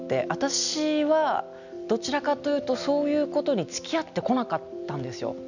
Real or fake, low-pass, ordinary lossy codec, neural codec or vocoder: real; 7.2 kHz; none; none